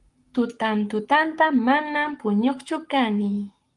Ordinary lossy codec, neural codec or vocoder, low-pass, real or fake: Opus, 32 kbps; codec, 44.1 kHz, 7.8 kbps, Pupu-Codec; 10.8 kHz; fake